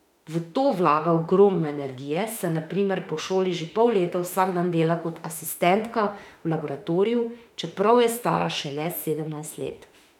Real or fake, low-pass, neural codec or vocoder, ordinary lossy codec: fake; 19.8 kHz; autoencoder, 48 kHz, 32 numbers a frame, DAC-VAE, trained on Japanese speech; none